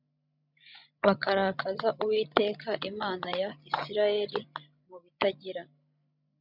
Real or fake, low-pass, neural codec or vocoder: fake; 5.4 kHz; codec, 16 kHz, 16 kbps, FreqCodec, larger model